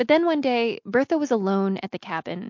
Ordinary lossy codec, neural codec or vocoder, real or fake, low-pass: MP3, 48 kbps; none; real; 7.2 kHz